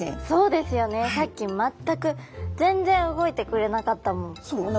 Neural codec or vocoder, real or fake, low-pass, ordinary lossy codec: none; real; none; none